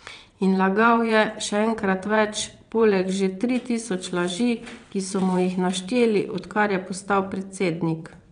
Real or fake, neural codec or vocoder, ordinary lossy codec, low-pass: fake; vocoder, 22.05 kHz, 80 mel bands, WaveNeXt; none; 9.9 kHz